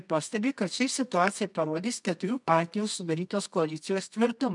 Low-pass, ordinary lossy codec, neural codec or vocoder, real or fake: 10.8 kHz; MP3, 96 kbps; codec, 24 kHz, 0.9 kbps, WavTokenizer, medium music audio release; fake